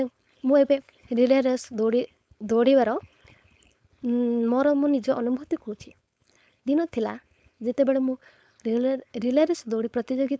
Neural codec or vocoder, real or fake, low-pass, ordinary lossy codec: codec, 16 kHz, 4.8 kbps, FACodec; fake; none; none